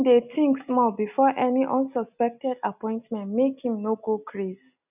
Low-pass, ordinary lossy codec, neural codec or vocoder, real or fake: 3.6 kHz; none; none; real